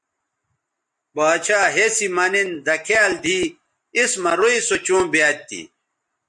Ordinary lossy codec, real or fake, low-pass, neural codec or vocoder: MP3, 48 kbps; real; 10.8 kHz; none